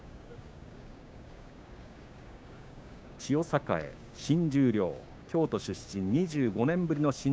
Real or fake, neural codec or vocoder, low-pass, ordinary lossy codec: fake; codec, 16 kHz, 6 kbps, DAC; none; none